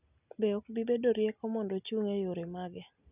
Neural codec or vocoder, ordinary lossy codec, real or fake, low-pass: none; none; real; 3.6 kHz